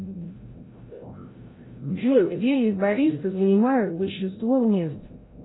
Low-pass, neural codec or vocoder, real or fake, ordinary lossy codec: 7.2 kHz; codec, 16 kHz, 0.5 kbps, FreqCodec, larger model; fake; AAC, 16 kbps